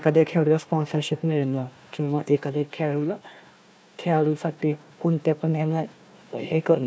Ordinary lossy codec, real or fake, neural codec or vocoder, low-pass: none; fake; codec, 16 kHz, 1 kbps, FunCodec, trained on Chinese and English, 50 frames a second; none